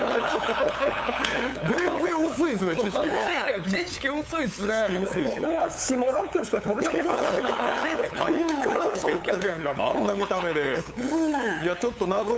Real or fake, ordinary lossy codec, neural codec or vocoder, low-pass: fake; none; codec, 16 kHz, 8 kbps, FunCodec, trained on LibriTTS, 25 frames a second; none